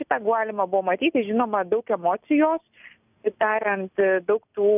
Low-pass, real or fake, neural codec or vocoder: 3.6 kHz; real; none